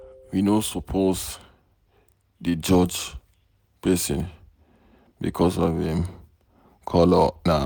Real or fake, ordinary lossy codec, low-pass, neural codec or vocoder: real; none; none; none